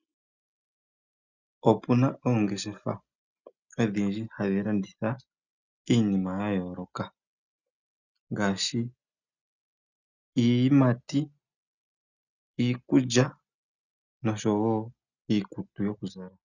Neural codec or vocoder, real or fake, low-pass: none; real; 7.2 kHz